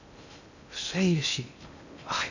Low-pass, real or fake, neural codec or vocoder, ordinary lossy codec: 7.2 kHz; fake; codec, 16 kHz in and 24 kHz out, 0.6 kbps, FocalCodec, streaming, 2048 codes; none